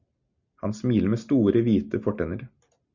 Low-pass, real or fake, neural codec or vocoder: 7.2 kHz; real; none